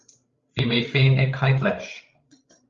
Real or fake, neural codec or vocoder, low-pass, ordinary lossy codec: fake; codec, 16 kHz, 8 kbps, FreqCodec, larger model; 7.2 kHz; Opus, 32 kbps